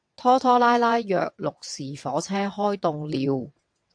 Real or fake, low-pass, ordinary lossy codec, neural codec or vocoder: fake; 9.9 kHz; AAC, 64 kbps; vocoder, 22.05 kHz, 80 mel bands, WaveNeXt